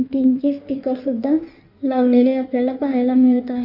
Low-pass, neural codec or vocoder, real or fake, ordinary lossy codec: 5.4 kHz; codec, 16 kHz in and 24 kHz out, 1.1 kbps, FireRedTTS-2 codec; fake; none